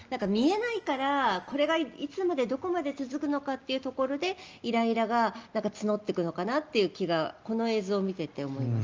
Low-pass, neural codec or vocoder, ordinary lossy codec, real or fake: 7.2 kHz; none; Opus, 24 kbps; real